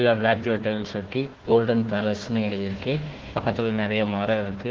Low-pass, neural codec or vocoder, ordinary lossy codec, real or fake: 7.2 kHz; codec, 16 kHz, 1 kbps, FunCodec, trained on Chinese and English, 50 frames a second; Opus, 16 kbps; fake